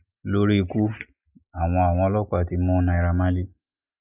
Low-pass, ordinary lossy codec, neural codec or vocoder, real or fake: 3.6 kHz; none; none; real